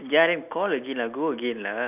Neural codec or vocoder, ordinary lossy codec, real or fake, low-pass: none; none; real; 3.6 kHz